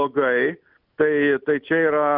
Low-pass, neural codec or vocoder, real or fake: 5.4 kHz; none; real